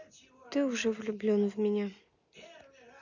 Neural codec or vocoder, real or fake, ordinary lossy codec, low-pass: none; real; none; 7.2 kHz